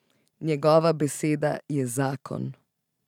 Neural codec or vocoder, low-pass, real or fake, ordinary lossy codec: vocoder, 44.1 kHz, 128 mel bands every 512 samples, BigVGAN v2; 19.8 kHz; fake; none